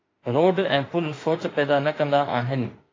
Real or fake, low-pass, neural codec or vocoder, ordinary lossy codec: fake; 7.2 kHz; autoencoder, 48 kHz, 32 numbers a frame, DAC-VAE, trained on Japanese speech; AAC, 32 kbps